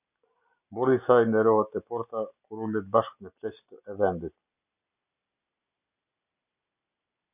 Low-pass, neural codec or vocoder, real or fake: 3.6 kHz; none; real